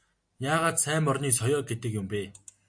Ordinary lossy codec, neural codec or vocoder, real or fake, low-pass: MP3, 48 kbps; none; real; 9.9 kHz